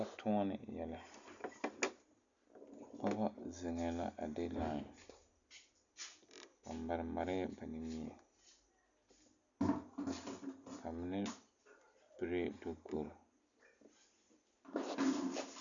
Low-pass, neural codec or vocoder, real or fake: 7.2 kHz; none; real